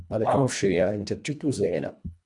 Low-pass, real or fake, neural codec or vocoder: 10.8 kHz; fake; codec, 24 kHz, 1.5 kbps, HILCodec